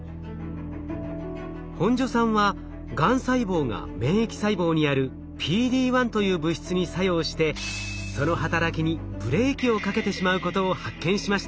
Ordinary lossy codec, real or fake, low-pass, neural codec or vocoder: none; real; none; none